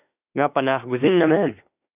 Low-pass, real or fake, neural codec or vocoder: 3.6 kHz; fake; autoencoder, 48 kHz, 32 numbers a frame, DAC-VAE, trained on Japanese speech